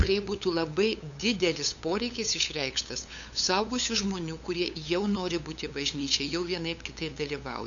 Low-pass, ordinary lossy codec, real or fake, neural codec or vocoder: 7.2 kHz; MP3, 96 kbps; fake; codec, 16 kHz, 8 kbps, FunCodec, trained on LibriTTS, 25 frames a second